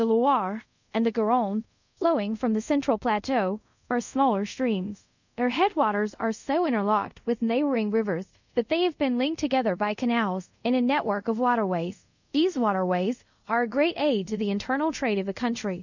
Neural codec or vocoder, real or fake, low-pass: codec, 24 kHz, 0.5 kbps, DualCodec; fake; 7.2 kHz